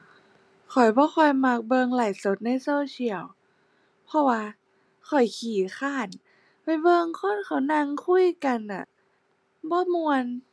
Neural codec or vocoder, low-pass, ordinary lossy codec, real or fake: none; none; none; real